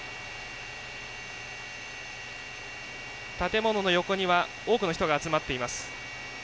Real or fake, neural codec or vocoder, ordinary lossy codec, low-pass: real; none; none; none